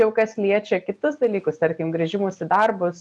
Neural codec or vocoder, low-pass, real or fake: none; 10.8 kHz; real